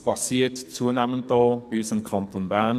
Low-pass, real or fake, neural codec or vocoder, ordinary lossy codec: 14.4 kHz; fake; codec, 44.1 kHz, 2.6 kbps, SNAC; none